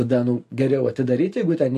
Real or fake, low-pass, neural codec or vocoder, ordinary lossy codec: real; 14.4 kHz; none; MP3, 64 kbps